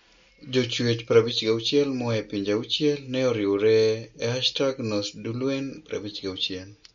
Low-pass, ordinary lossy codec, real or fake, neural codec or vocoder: 7.2 kHz; MP3, 48 kbps; real; none